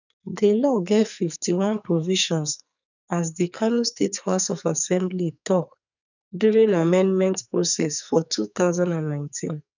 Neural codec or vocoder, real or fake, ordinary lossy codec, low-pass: codec, 44.1 kHz, 2.6 kbps, SNAC; fake; none; 7.2 kHz